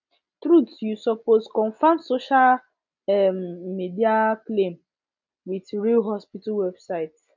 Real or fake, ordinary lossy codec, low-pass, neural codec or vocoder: real; none; 7.2 kHz; none